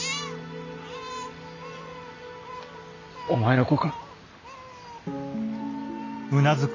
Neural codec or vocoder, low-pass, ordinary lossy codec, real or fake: none; 7.2 kHz; AAC, 32 kbps; real